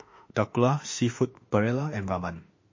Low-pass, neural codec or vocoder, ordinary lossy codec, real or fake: 7.2 kHz; autoencoder, 48 kHz, 32 numbers a frame, DAC-VAE, trained on Japanese speech; MP3, 32 kbps; fake